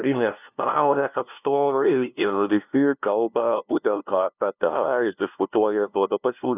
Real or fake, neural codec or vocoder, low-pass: fake; codec, 16 kHz, 0.5 kbps, FunCodec, trained on LibriTTS, 25 frames a second; 3.6 kHz